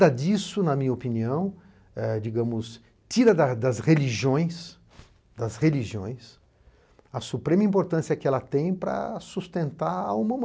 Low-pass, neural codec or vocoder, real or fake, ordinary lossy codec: none; none; real; none